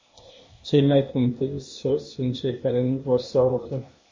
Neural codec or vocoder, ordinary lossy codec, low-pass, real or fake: codec, 16 kHz, 0.8 kbps, ZipCodec; MP3, 32 kbps; 7.2 kHz; fake